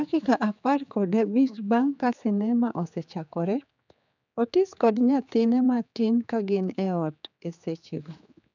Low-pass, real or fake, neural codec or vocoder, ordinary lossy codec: 7.2 kHz; fake; codec, 16 kHz, 4 kbps, X-Codec, HuBERT features, trained on general audio; none